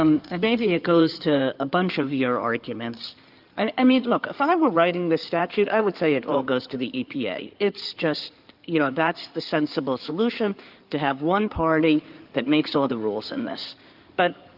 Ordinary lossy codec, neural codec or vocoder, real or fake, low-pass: Opus, 24 kbps; codec, 16 kHz in and 24 kHz out, 2.2 kbps, FireRedTTS-2 codec; fake; 5.4 kHz